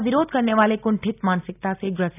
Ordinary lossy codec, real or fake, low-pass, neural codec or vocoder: none; real; 3.6 kHz; none